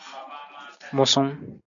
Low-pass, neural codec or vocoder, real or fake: 7.2 kHz; none; real